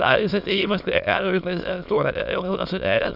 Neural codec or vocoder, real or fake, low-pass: autoencoder, 22.05 kHz, a latent of 192 numbers a frame, VITS, trained on many speakers; fake; 5.4 kHz